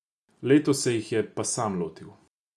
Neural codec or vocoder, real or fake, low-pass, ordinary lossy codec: none; real; none; none